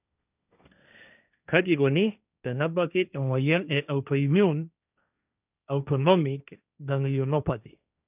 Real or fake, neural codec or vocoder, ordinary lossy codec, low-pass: fake; codec, 16 kHz, 1.1 kbps, Voila-Tokenizer; none; 3.6 kHz